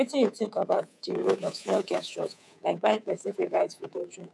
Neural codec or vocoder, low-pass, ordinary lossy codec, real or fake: codec, 24 kHz, 3.1 kbps, DualCodec; none; none; fake